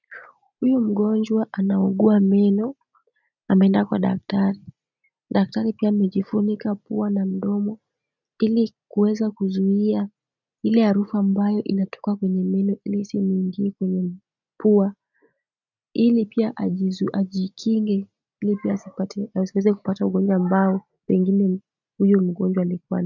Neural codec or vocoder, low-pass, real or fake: none; 7.2 kHz; real